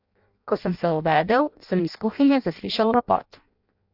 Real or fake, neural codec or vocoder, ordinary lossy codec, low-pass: fake; codec, 16 kHz in and 24 kHz out, 0.6 kbps, FireRedTTS-2 codec; AAC, 48 kbps; 5.4 kHz